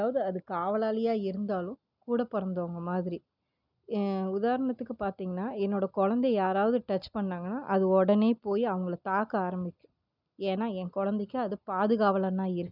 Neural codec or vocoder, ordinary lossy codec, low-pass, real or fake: none; none; 5.4 kHz; real